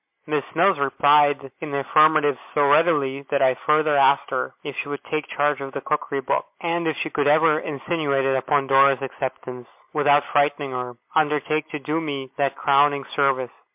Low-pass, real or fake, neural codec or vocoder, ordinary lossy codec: 3.6 kHz; real; none; MP3, 32 kbps